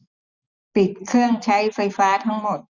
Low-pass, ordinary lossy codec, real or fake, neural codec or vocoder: 7.2 kHz; none; real; none